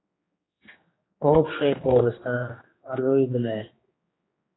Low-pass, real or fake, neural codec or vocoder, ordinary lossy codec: 7.2 kHz; fake; codec, 16 kHz, 2 kbps, X-Codec, HuBERT features, trained on general audio; AAC, 16 kbps